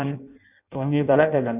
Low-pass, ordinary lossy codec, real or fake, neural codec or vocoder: 3.6 kHz; none; fake; codec, 16 kHz in and 24 kHz out, 0.6 kbps, FireRedTTS-2 codec